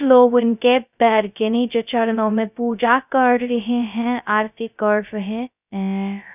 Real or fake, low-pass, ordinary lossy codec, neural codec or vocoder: fake; 3.6 kHz; none; codec, 16 kHz, 0.2 kbps, FocalCodec